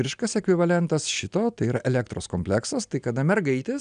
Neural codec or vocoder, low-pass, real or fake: none; 9.9 kHz; real